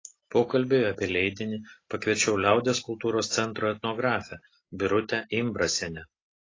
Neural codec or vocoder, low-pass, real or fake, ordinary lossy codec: none; 7.2 kHz; real; AAC, 32 kbps